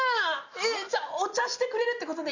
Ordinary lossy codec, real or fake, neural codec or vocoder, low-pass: none; real; none; 7.2 kHz